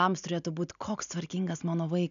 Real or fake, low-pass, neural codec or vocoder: real; 7.2 kHz; none